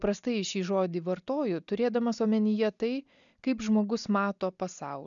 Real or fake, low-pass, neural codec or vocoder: real; 7.2 kHz; none